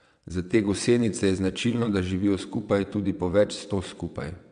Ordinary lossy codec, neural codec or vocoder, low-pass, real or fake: MP3, 64 kbps; vocoder, 22.05 kHz, 80 mel bands, WaveNeXt; 9.9 kHz; fake